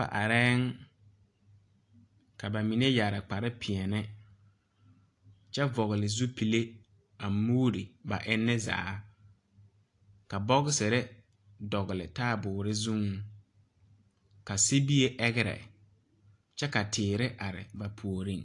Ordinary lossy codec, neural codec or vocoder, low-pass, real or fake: AAC, 48 kbps; none; 10.8 kHz; real